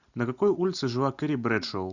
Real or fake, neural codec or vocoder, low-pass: real; none; 7.2 kHz